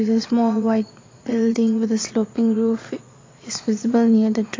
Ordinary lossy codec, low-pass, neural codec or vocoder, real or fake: AAC, 32 kbps; 7.2 kHz; vocoder, 22.05 kHz, 80 mel bands, Vocos; fake